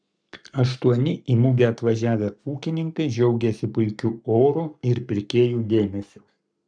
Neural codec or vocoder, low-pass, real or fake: codec, 44.1 kHz, 7.8 kbps, Pupu-Codec; 9.9 kHz; fake